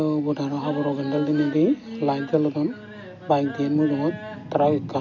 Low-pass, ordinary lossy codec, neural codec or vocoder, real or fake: 7.2 kHz; none; none; real